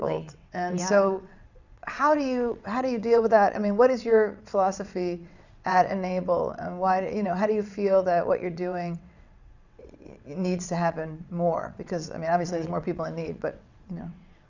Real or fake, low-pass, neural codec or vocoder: fake; 7.2 kHz; vocoder, 22.05 kHz, 80 mel bands, WaveNeXt